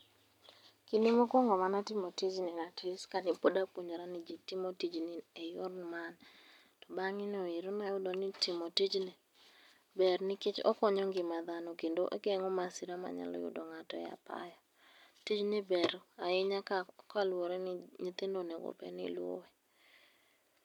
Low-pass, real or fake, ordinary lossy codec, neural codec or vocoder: 19.8 kHz; real; none; none